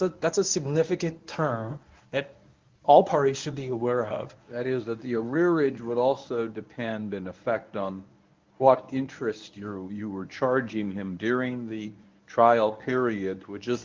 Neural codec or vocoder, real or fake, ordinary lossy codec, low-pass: codec, 24 kHz, 0.9 kbps, WavTokenizer, medium speech release version 1; fake; Opus, 24 kbps; 7.2 kHz